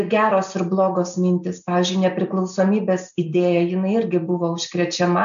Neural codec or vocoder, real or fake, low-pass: none; real; 7.2 kHz